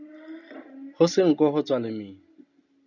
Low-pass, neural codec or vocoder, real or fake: 7.2 kHz; none; real